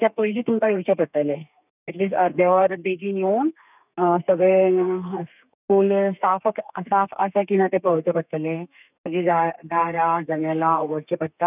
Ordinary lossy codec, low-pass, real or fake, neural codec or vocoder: none; 3.6 kHz; fake; codec, 32 kHz, 1.9 kbps, SNAC